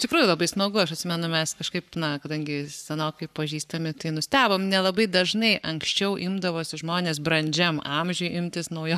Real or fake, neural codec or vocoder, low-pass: fake; codec, 44.1 kHz, 7.8 kbps, Pupu-Codec; 14.4 kHz